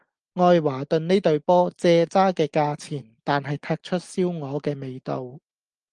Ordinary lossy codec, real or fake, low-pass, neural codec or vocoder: Opus, 24 kbps; real; 9.9 kHz; none